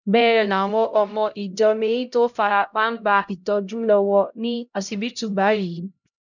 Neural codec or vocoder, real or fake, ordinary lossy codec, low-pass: codec, 16 kHz, 0.5 kbps, X-Codec, HuBERT features, trained on LibriSpeech; fake; none; 7.2 kHz